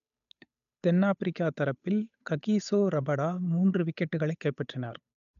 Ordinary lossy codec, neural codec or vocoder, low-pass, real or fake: none; codec, 16 kHz, 8 kbps, FunCodec, trained on Chinese and English, 25 frames a second; 7.2 kHz; fake